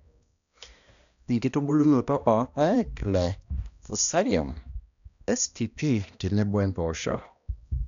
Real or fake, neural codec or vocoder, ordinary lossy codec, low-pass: fake; codec, 16 kHz, 1 kbps, X-Codec, HuBERT features, trained on balanced general audio; none; 7.2 kHz